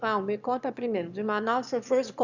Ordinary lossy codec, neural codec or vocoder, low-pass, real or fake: none; autoencoder, 22.05 kHz, a latent of 192 numbers a frame, VITS, trained on one speaker; 7.2 kHz; fake